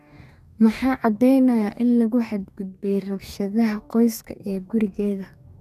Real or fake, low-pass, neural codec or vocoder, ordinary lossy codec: fake; 14.4 kHz; codec, 44.1 kHz, 2.6 kbps, DAC; none